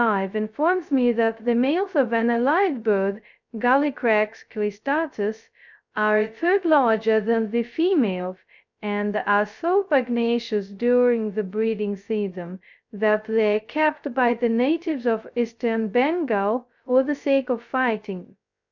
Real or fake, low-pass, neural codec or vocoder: fake; 7.2 kHz; codec, 16 kHz, 0.2 kbps, FocalCodec